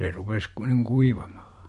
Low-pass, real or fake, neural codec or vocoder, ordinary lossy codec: 14.4 kHz; fake; vocoder, 44.1 kHz, 128 mel bands every 512 samples, BigVGAN v2; MP3, 48 kbps